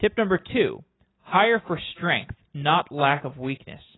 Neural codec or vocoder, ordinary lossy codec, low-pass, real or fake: none; AAC, 16 kbps; 7.2 kHz; real